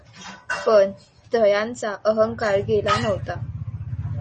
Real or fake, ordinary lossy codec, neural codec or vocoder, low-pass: real; MP3, 32 kbps; none; 9.9 kHz